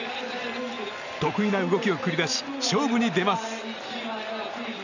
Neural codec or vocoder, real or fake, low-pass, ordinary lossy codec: none; real; 7.2 kHz; none